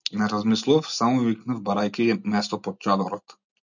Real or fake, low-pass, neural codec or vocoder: real; 7.2 kHz; none